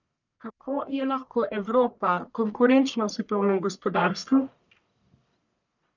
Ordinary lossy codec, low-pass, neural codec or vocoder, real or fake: none; 7.2 kHz; codec, 44.1 kHz, 1.7 kbps, Pupu-Codec; fake